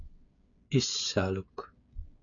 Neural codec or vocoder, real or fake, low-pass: codec, 16 kHz, 8 kbps, FreqCodec, smaller model; fake; 7.2 kHz